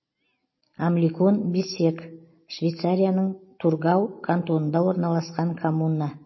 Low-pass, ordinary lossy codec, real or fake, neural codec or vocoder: 7.2 kHz; MP3, 24 kbps; real; none